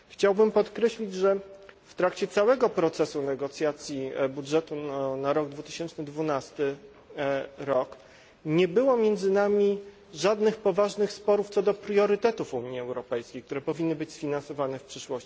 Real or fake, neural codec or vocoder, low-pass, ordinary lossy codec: real; none; none; none